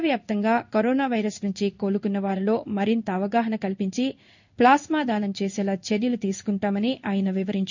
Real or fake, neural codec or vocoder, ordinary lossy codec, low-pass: fake; codec, 16 kHz in and 24 kHz out, 1 kbps, XY-Tokenizer; none; 7.2 kHz